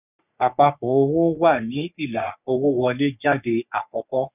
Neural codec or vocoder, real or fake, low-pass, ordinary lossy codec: codec, 44.1 kHz, 3.4 kbps, Pupu-Codec; fake; 3.6 kHz; none